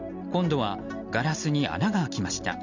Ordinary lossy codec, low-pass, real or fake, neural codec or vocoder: none; 7.2 kHz; real; none